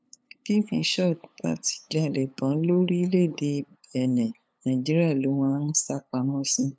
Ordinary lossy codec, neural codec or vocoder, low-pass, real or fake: none; codec, 16 kHz, 8 kbps, FunCodec, trained on LibriTTS, 25 frames a second; none; fake